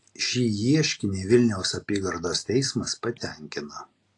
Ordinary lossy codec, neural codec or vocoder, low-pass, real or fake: AAC, 48 kbps; none; 10.8 kHz; real